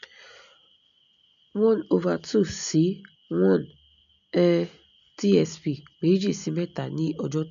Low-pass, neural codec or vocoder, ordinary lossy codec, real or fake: 7.2 kHz; none; none; real